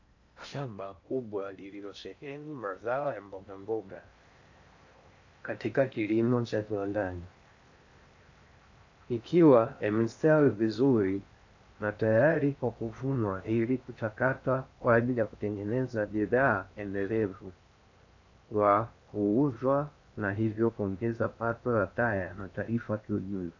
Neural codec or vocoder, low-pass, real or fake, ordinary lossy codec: codec, 16 kHz in and 24 kHz out, 0.6 kbps, FocalCodec, streaming, 4096 codes; 7.2 kHz; fake; AAC, 48 kbps